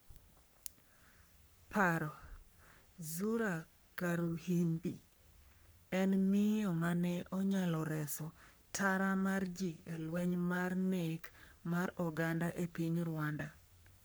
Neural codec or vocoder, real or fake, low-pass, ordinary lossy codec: codec, 44.1 kHz, 3.4 kbps, Pupu-Codec; fake; none; none